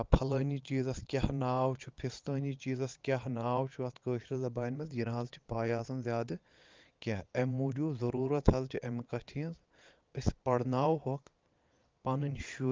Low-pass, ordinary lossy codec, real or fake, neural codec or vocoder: 7.2 kHz; Opus, 32 kbps; fake; vocoder, 22.05 kHz, 80 mel bands, Vocos